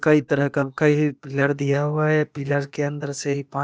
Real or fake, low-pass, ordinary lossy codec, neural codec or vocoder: fake; none; none; codec, 16 kHz, 0.8 kbps, ZipCodec